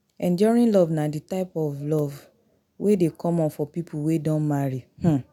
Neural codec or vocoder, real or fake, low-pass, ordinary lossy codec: none; real; 19.8 kHz; none